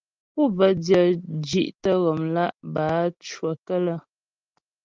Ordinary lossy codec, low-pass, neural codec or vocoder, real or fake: Opus, 32 kbps; 7.2 kHz; none; real